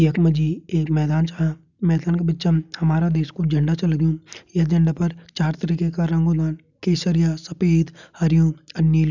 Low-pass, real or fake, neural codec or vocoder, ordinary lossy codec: 7.2 kHz; real; none; none